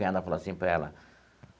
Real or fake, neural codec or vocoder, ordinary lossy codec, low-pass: real; none; none; none